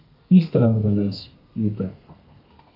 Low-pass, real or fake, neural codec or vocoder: 5.4 kHz; fake; codec, 32 kHz, 1.9 kbps, SNAC